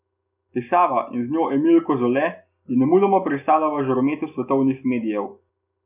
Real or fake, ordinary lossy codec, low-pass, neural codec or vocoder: real; none; 3.6 kHz; none